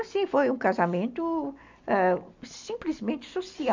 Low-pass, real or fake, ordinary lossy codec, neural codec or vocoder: 7.2 kHz; real; MP3, 64 kbps; none